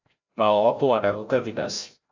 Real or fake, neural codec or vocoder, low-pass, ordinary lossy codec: fake; codec, 16 kHz, 0.5 kbps, FreqCodec, larger model; 7.2 kHz; AAC, 48 kbps